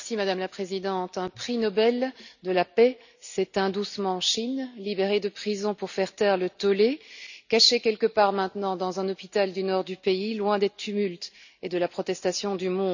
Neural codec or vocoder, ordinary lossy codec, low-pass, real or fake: none; none; 7.2 kHz; real